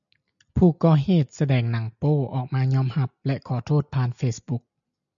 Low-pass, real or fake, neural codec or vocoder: 7.2 kHz; real; none